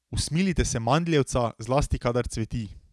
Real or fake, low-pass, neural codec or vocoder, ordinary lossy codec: real; none; none; none